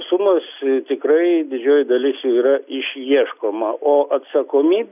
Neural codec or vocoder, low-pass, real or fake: none; 3.6 kHz; real